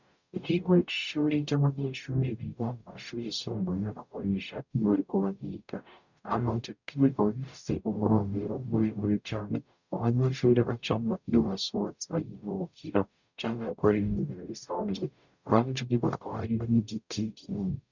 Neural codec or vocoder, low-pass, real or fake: codec, 44.1 kHz, 0.9 kbps, DAC; 7.2 kHz; fake